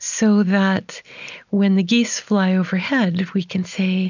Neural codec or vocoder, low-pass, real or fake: none; 7.2 kHz; real